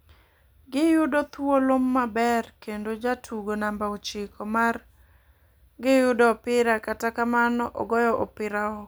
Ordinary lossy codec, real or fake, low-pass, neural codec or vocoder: none; real; none; none